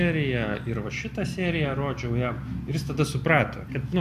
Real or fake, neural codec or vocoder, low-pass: real; none; 14.4 kHz